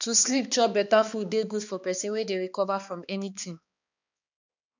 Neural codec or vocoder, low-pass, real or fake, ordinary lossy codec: codec, 16 kHz, 2 kbps, X-Codec, HuBERT features, trained on balanced general audio; 7.2 kHz; fake; none